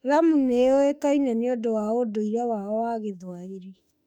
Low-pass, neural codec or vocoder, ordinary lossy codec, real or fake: 19.8 kHz; autoencoder, 48 kHz, 32 numbers a frame, DAC-VAE, trained on Japanese speech; none; fake